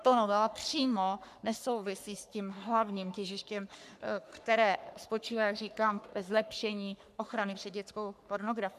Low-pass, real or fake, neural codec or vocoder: 14.4 kHz; fake; codec, 44.1 kHz, 3.4 kbps, Pupu-Codec